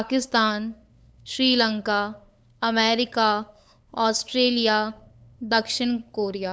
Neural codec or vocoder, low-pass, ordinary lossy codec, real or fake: codec, 16 kHz, 8 kbps, FunCodec, trained on LibriTTS, 25 frames a second; none; none; fake